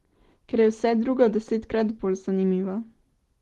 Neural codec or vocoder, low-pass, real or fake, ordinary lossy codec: none; 19.8 kHz; real; Opus, 16 kbps